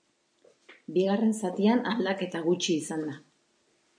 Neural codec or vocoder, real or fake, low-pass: none; real; 9.9 kHz